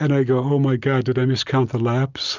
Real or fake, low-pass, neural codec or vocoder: real; 7.2 kHz; none